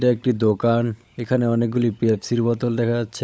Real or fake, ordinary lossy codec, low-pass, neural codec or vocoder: fake; none; none; codec, 16 kHz, 16 kbps, FunCodec, trained on LibriTTS, 50 frames a second